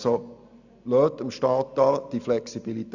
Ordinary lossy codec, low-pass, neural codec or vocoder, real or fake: none; 7.2 kHz; none; real